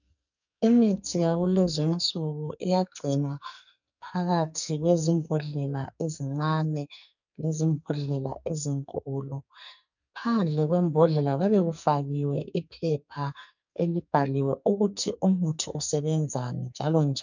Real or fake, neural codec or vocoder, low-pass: fake; codec, 44.1 kHz, 2.6 kbps, SNAC; 7.2 kHz